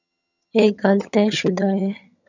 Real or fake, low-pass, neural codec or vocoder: fake; 7.2 kHz; vocoder, 22.05 kHz, 80 mel bands, HiFi-GAN